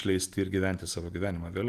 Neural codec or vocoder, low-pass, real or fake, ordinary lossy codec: none; 14.4 kHz; real; Opus, 32 kbps